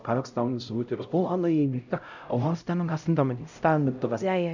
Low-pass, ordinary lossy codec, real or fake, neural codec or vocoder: 7.2 kHz; none; fake; codec, 16 kHz, 0.5 kbps, X-Codec, HuBERT features, trained on LibriSpeech